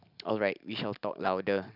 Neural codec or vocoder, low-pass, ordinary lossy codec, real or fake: none; 5.4 kHz; none; real